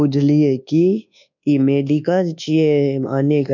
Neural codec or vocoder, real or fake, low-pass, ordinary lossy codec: codec, 24 kHz, 1.2 kbps, DualCodec; fake; 7.2 kHz; none